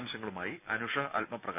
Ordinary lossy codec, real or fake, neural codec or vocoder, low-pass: none; real; none; 3.6 kHz